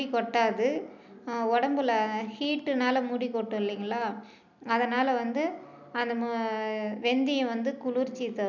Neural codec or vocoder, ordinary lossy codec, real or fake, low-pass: none; none; real; 7.2 kHz